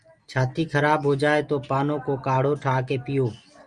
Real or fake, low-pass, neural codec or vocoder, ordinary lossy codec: real; 9.9 kHz; none; Opus, 32 kbps